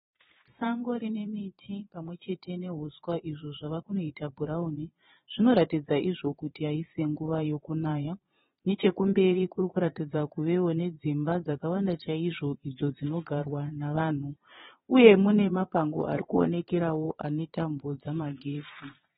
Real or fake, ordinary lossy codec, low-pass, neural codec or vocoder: fake; AAC, 16 kbps; 9.9 kHz; vocoder, 22.05 kHz, 80 mel bands, Vocos